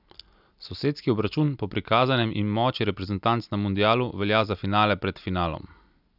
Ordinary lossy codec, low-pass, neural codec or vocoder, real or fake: none; 5.4 kHz; none; real